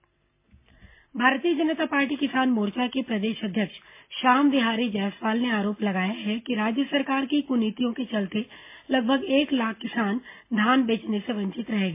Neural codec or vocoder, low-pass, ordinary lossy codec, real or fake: none; 3.6 kHz; MP3, 24 kbps; real